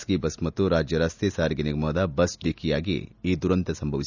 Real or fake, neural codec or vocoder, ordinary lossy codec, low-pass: real; none; none; 7.2 kHz